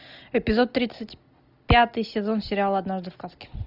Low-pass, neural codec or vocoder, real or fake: 5.4 kHz; none; real